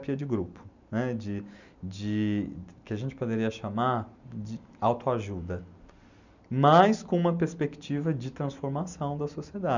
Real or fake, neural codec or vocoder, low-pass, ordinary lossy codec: real; none; 7.2 kHz; none